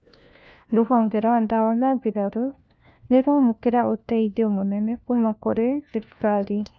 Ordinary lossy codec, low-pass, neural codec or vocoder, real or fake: none; none; codec, 16 kHz, 1 kbps, FunCodec, trained on LibriTTS, 50 frames a second; fake